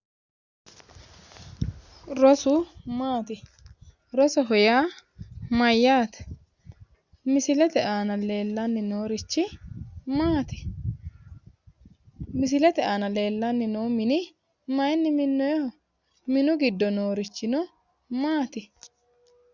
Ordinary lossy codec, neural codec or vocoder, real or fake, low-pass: Opus, 64 kbps; none; real; 7.2 kHz